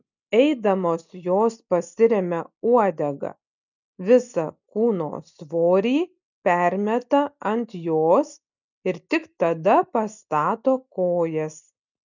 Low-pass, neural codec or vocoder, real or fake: 7.2 kHz; none; real